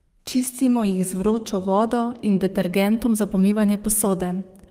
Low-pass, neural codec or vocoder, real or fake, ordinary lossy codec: 14.4 kHz; codec, 32 kHz, 1.9 kbps, SNAC; fake; Opus, 32 kbps